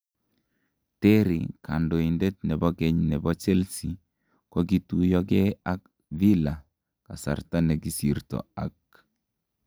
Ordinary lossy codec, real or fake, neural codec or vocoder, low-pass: none; real; none; none